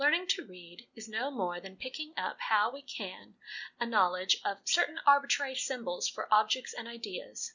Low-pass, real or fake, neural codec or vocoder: 7.2 kHz; real; none